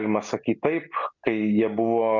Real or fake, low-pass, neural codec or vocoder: real; 7.2 kHz; none